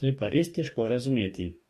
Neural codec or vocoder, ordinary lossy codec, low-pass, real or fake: codec, 44.1 kHz, 2.6 kbps, DAC; MP3, 64 kbps; 14.4 kHz; fake